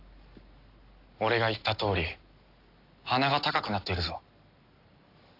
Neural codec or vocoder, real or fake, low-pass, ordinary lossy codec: none; real; 5.4 kHz; AAC, 32 kbps